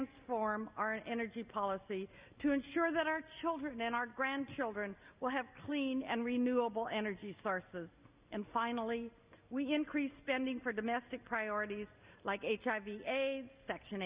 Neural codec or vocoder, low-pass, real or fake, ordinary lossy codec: none; 3.6 kHz; real; Opus, 64 kbps